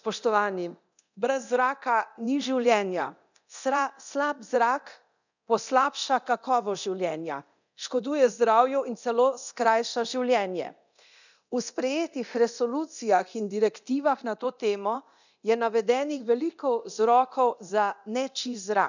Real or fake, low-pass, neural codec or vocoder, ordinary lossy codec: fake; 7.2 kHz; codec, 24 kHz, 0.9 kbps, DualCodec; none